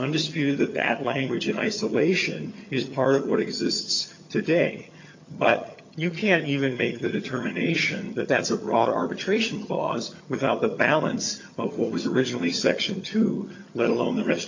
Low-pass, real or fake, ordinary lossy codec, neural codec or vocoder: 7.2 kHz; fake; MP3, 48 kbps; vocoder, 22.05 kHz, 80 mel bands, HiFi-GAN